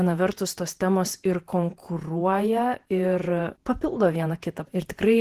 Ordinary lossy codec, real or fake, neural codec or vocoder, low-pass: Opus, 16 kbps; fake; vocoder, 48 kHz, 128 mel bands, Vocos; 14.4 kHz